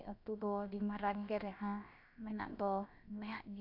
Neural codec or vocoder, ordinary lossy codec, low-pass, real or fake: codec, 16 kHz, about 1 kbps, DyCAST, with the encoder's durations; none; 5.4 kHz; fake